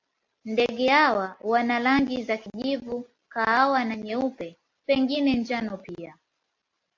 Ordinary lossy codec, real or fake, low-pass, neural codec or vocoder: AAC, 48 kbps; real; 7.2 kHz; none